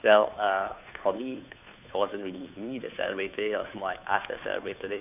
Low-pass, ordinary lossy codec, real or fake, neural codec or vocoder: 3.6 kHz; none; fake; codec, 16 kHz, 2 kbps, FunCodec, trained on Chinese and English, 25 frames a second